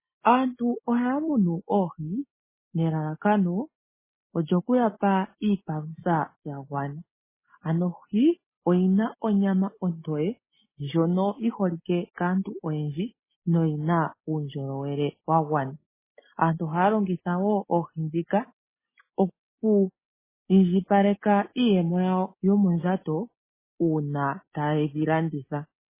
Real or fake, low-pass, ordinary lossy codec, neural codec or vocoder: real; 3.6 kHz; MP3, 16 kbps; none